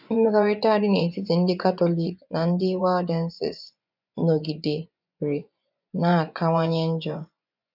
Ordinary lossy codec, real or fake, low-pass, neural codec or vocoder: none; fake; 5.4 kHz; vocoder, 24 kHz, 100 mel bands, Vocos